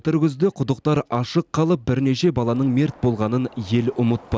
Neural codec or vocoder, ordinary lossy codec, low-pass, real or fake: none; none; none; real